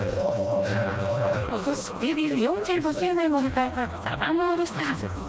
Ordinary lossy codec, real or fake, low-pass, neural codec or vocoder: none; fake; none; codec, 16 kHz, 1 kbps, FreqCodec, smaller model